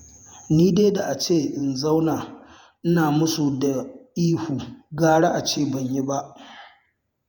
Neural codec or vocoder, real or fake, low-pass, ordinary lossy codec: vocoder, 48 kHz, 128 mel bands, Vocos; fake; 19.8 kHz; MP3, 96 kbps